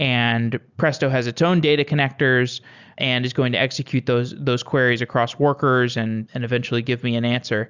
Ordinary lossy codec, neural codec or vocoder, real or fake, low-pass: Opus, 64 kbps; none; real; 7.2 kHz